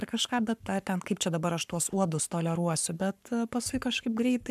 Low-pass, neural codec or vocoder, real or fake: 14.4 kHz; codec, 44.1 kHz, 7.8 kbps, Pupu-Codec; fake